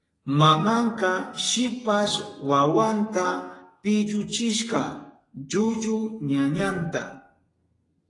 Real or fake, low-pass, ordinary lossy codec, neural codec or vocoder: fake; 10.8 kHz; AAC, 32 kbps; codec, 32 kHz, 1.9 kbps, SNAC